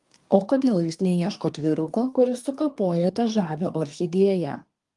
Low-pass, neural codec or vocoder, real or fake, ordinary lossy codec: 10.8 kHz; codec, 24 kHz, 1 kbps, SNAC; fake; Opus, 32 kbps